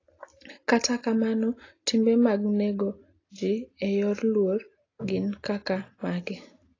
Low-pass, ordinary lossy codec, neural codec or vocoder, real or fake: 7.2 kHz; AAC, 32 kbps; none; real